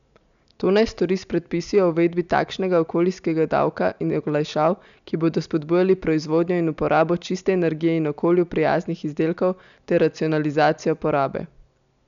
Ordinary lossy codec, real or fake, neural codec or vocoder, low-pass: none; real; none; 7.2 kHz